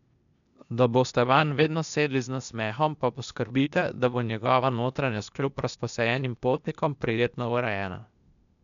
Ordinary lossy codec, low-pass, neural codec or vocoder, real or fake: none; 7.2 kHz; codec, 16 kHz, 0.8 kbps, ZipCodec; fake